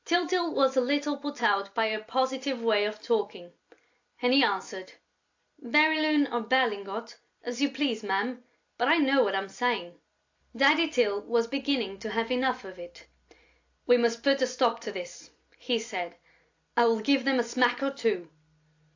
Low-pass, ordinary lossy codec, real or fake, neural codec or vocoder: 7.2 kHz; AAC, 48 kbps; real; none